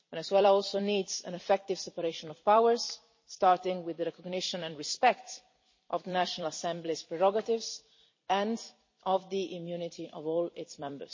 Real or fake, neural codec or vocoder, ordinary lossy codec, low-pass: fake; vocoder, 44.1 kHz, 128 mel bands every 256 samples, BigVGAN v2; MP3, 32 kbps; 7.2 kHz